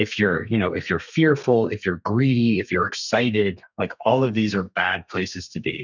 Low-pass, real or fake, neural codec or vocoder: 7.2 kHz; fake; codec, 32 kHz, 1.9 kbps, SNAC